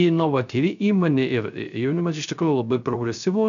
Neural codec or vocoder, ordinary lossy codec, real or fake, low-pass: codec, 16 kHz, 0.3 kbps, FocalCodec; MP3, 96 kbps; fake; 7.2 kHz